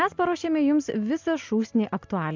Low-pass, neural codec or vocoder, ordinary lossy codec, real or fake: 7.2 kHz; none; MP3, 64 kbps; real